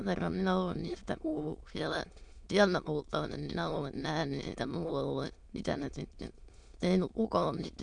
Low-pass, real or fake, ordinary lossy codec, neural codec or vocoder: 9.9 kHz; fake; MP3, 64 kbps; autoencoder, 22.05 kHz, a latent of 192 numbers a frame, VITS, trained on many speakers